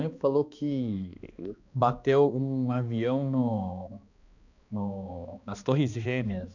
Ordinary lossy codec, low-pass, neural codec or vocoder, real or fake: none; 7.2 kHz; codec, 16 kHz, 2 kbps, X-Codec, HuBERT features, trained on balanced general audio; fake